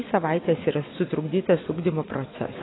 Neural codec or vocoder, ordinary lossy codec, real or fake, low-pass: none; AAC, 16 kbps; real; 7.2 kHz